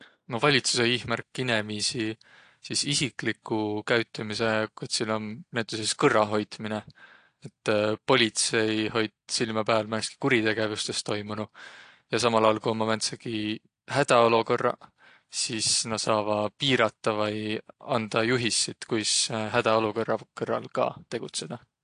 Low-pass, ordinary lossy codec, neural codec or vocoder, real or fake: 9.9 kHz; AAC, 48 kbps; none; real